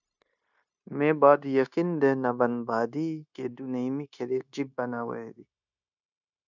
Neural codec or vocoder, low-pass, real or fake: codec, 16 kHz, 0.9 kbps, LongCat-Audio-Codec; 7.2 kHz; fake